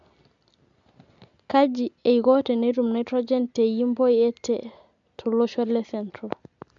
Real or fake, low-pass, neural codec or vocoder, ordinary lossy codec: real; 7.2 kHz; none; MP3, 64 kbps